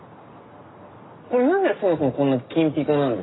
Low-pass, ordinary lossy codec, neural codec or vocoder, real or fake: 7.2 kHz; AAC, 16 kbps; none; real